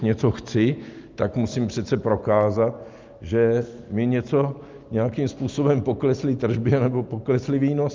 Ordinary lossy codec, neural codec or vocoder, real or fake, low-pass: Opus, 24 kbps; none; real; 7.2 kHz